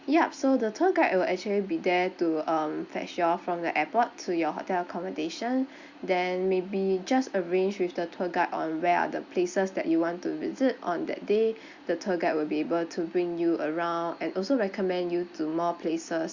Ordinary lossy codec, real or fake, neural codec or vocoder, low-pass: Opus, 64 kbps; real; none; 7.2 kHz